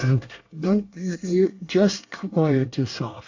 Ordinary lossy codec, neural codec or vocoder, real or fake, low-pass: AAC, 48 kbps; codec, 24 kHz, 1 kbps, SNAC; fake; 7.2 kHz